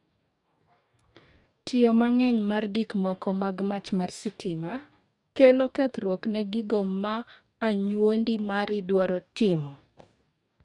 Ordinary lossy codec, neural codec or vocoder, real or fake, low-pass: none; codec, 44.1 kHz, 2.6 kbps, DAC; fake; 10.8 kHz